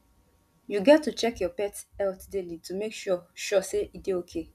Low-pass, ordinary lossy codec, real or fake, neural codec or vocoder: 14.4 kHz; none; real; none